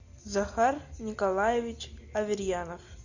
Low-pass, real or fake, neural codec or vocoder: 7.2 kHz; real; none